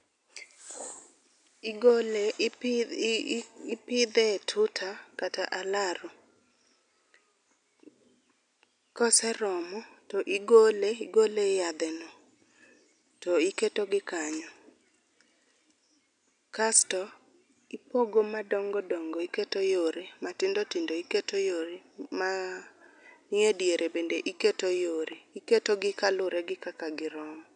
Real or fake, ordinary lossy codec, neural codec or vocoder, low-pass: real; none; none; 9.9 kHz